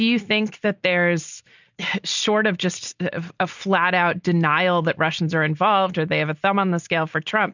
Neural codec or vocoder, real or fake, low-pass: none; real; 7.2 kHz